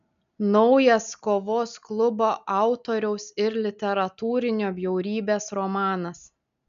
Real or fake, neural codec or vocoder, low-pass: real; none; 7.2 kHz